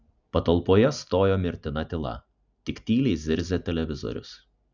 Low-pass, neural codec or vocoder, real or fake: 7.2 kHz; none; real